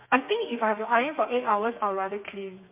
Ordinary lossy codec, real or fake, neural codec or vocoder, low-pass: MP3, 32 kbps; fake; codec, 32 kHz, 1.9 kbps, SNAC; 3.6 kHz